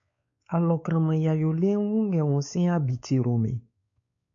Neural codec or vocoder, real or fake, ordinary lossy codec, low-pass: codec, 16 kHz, 4 kbps, X-Codec, WavLM features, trained on Multilingual LibriSpeech; fake; AAC, 64 kbps; 7.2 kHz